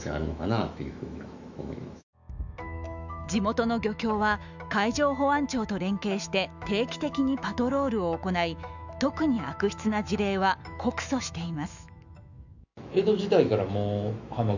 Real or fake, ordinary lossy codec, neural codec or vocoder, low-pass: fake; none; autoencoder, 48 kHz, 128 numbers a frame, DAC-VAE, trained on Japanese speech; 7.2 kHz